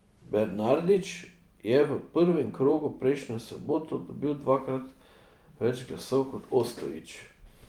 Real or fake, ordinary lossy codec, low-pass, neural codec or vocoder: fake; Opus, 32 kbps; 19.8 kHz; vocoder, 44.1 kHz, 128 mel bands every 512 samples, BigVGAN v2